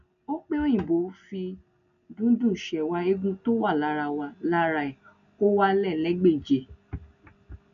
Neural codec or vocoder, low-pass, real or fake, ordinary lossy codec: none; 7.2 kHz; real; none